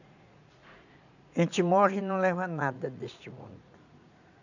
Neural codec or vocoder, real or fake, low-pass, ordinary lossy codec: vocoder, 44.1 kHz, 80 mel bands, Vocos; fake; 7.2 kHz; none